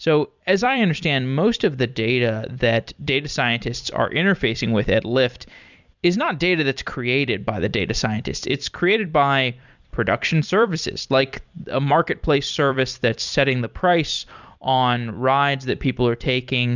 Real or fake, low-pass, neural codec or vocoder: fake; 7.2 kHz; vocoder, 44.1 kHz, 128 mel bands every 256 samples, BigVGAN v2